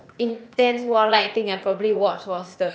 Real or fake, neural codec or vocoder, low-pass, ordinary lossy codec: fake; codec, 16 kHz, 0.8 kbps, ZipCodec; none; none